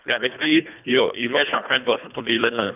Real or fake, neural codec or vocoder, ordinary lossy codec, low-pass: fake; codec, 24 kHz, 1.5 kbps, HILCodec; none; 3.6 kHz